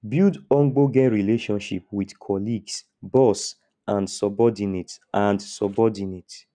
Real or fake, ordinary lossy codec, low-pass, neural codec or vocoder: real; none; 9.9 kHz; none